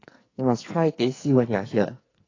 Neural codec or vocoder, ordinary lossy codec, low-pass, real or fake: codec, 44.1 kHz, 2.6 kbps, SNAC; AAC, 32 kbps; 7.2 kHz; fake